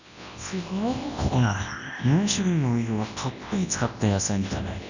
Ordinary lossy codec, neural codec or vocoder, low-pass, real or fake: none; codec, 24 kHz, 0.9 kbps, WavTokenizer, large speech release; 7.2 kHz; fake